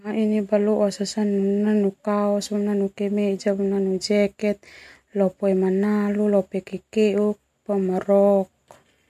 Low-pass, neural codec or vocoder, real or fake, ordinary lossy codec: 14.4 kHz; none; real; MP3, 64 kbps